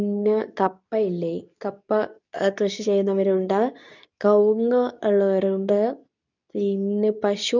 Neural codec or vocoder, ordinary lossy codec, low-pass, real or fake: codec, 24 kHz, 0.9 kbps, WavTokenizer, medium speech release version 2; none; 7.2 kHz; fake